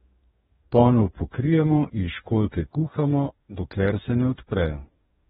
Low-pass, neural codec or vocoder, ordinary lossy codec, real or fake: 19.8 kHz; codec, 44.1 kHz, 2.6 kbps, DAC; AAC, 16 kbps; fake